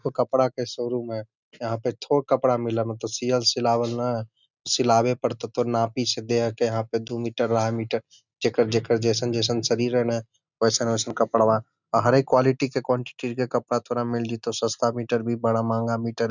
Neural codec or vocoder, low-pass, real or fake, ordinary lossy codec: none; 7.2 kHz; real; none